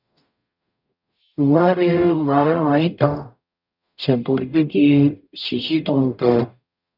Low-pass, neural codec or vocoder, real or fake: 5.4 kHz; codec, 44.1 kHz, 0.9 kbps, DAC; fake